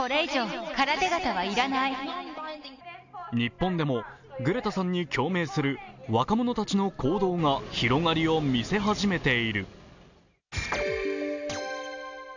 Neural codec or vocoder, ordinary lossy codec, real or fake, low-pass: none; none; real; 7.2 kHz